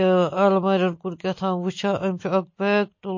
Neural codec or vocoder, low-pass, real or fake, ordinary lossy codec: none; 7.2 kHz; real; MP3, 32 kbps